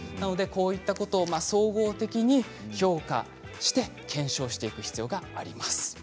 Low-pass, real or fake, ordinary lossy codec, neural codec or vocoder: none; real; none; none